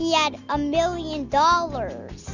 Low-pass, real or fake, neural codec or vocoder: 7.2 kHz; real; none